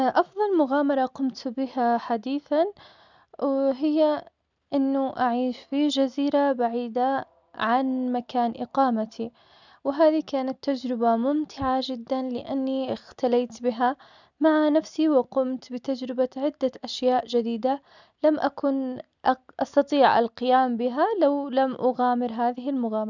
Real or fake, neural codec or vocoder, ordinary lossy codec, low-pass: real; none; none; 7.2 kHz